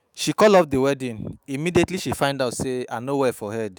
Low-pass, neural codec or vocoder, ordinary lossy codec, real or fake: none; autoencoder, 48 kHz, 128 numbers a frame, DAC-VAE, trained on Japanese speech; none; fake